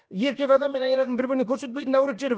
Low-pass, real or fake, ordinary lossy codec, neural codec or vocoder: none; fake; none; codec, 16 kHz, about 1 kbps, DyCAST, with the encoder's durations